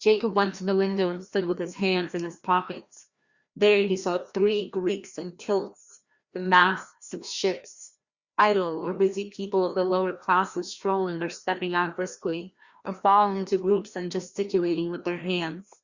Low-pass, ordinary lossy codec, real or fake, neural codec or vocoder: 7.2 kHz; Opus, 64 kbps; fake; codec, 16 kHz, 1 kbps, FreqCodec, larger model